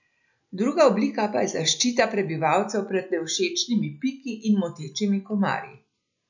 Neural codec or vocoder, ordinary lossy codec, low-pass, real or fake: none; none; 7.2 kHz; real